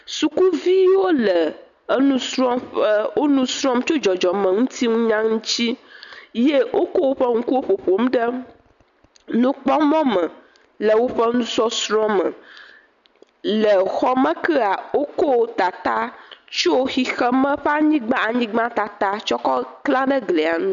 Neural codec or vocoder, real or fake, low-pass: none; real; 7.2 kHz